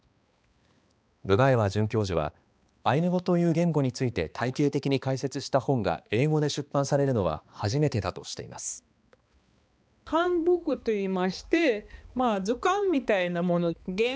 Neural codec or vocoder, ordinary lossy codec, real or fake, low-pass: codec, 16 kHz, 2 kbps, X-Codec, HuBERT features, trained on balanced general audio; none; fake; none